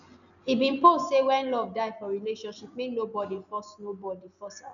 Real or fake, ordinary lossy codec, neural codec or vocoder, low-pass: real; none; none; 7.2 kHz